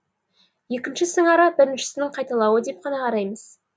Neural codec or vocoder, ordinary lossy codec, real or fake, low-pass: none; none; real; none